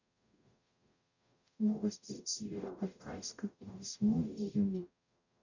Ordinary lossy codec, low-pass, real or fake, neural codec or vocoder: none; 7.2 kHz; fake; codec, 44.1 kHz, 0.9 kbps, DAC